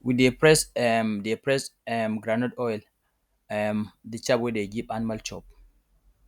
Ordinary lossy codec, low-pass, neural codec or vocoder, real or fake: none; 19.8 kHz; none; real